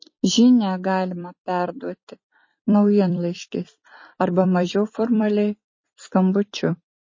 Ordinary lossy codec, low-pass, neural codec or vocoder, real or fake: MP3, 32 kbps; 7.2 kHz; vocoder, 44.1 kHz, 128 mel bands every 256 samples, BigVGAN v2; fake